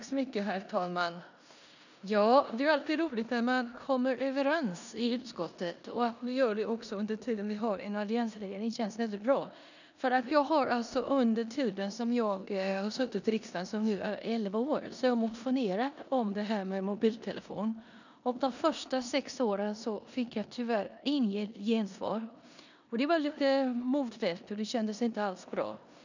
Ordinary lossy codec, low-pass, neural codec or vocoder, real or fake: none; 7.2 kHz; codec, 16 kHz in and 24 kHz out, 0.9 kbps, LongCat-Audio-Codec, four codebook decoder; fake